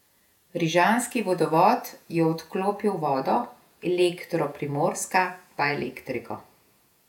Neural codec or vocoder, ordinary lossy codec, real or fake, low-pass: vocoder, 48 kHz, 128 mel bands, Vocos; none; fake; 19.8 kHz